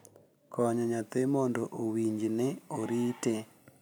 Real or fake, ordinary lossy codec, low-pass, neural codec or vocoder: real; none; none; none